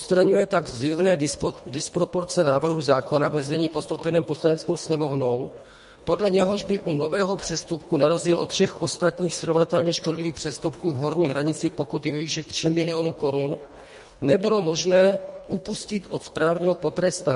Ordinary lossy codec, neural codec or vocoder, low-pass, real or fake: MP3, 48 kbps; codec, 24 kHz, 1.5 kbps, HILCodec; 10.8 kHz; fake